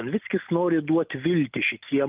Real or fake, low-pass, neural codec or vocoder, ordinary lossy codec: real; 3.6 kHz; none; Opus, 32 kbps